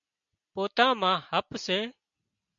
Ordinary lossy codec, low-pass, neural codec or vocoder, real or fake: MP3, 64 kbps; 7.2 kHz; none; real